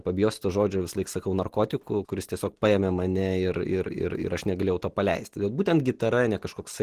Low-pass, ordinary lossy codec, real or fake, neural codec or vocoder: 14.4 kHz; Opus, 16 kbps; real; none